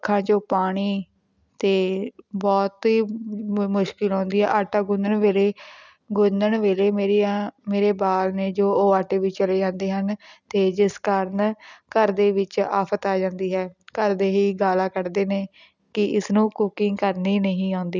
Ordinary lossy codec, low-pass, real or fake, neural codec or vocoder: none; 7.2 kHz; real; none